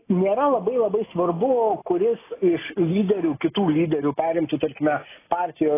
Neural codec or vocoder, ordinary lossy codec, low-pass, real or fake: none; AAC, 16 kbps; 3.6 kHz; real